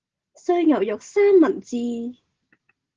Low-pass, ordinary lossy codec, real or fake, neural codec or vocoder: 7.2 kHz; Opus, 16 kbps; real; none